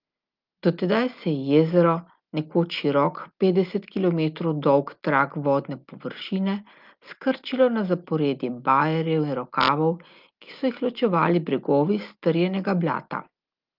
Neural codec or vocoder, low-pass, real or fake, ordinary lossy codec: none; 5.4 kHz; real; Opus, 32 kbps